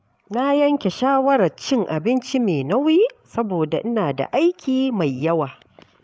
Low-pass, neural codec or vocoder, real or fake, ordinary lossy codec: none; codec, 16 kHz, 16 kbps, FreqCodec, larger model; fake; none